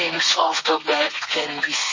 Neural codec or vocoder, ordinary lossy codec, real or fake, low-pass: codec, 32 kHz, 1.9 kbps, SNAC; MP3, 32 kbps; fake; 7.2 kHz